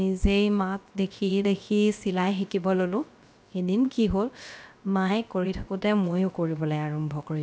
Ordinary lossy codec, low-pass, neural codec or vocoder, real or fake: none; none; codec, 16 kHz, about 1 kbps, DyCAST, with the encoder's durations; fake